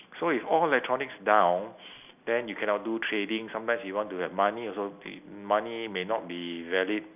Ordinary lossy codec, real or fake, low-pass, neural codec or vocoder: none; real; 3.6 kHz; none